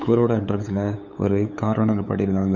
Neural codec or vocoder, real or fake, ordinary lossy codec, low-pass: codec, 16 kHz, 8 kbps, FunCodec, trained on LibriTTS, 25 frames a second; fake; none; 7.2 kHz